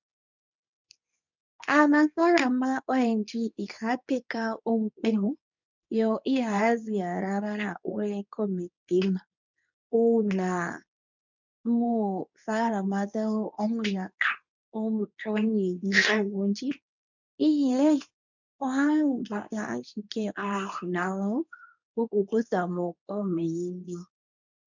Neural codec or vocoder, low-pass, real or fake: codec, 24 kHz, 0.9 kbps, WavTokenizer, medium speech release version 2; 7.2 kHz; fake